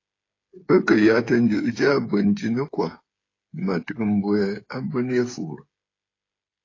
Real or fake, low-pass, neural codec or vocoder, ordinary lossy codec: fake; 7.2 kHz; codec, 16 kHz, 8 kbps, FreqCodec, smaller model; AAC, 32 kbps